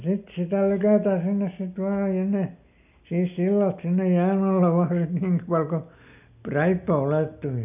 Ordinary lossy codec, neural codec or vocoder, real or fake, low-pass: none; none; real; 3.6 kHz